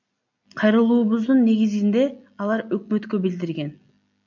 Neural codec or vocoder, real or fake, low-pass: none; real; 7.2 kHz